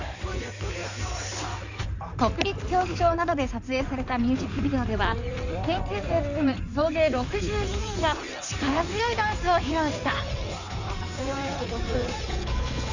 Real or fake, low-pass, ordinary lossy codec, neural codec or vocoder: fake; 7.2 kHz; none; codec, 16 kHz in and 24 kHz out, 2.2 kbps, FireRedTTS-2 codec